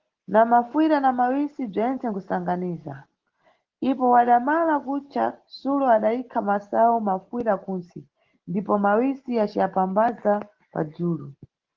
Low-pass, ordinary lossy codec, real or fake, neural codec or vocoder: 7.2 kHz; Opus, 16 kbps; real; none